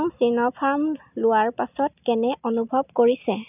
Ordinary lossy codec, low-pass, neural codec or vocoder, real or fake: none; 3.6 kHz; none; real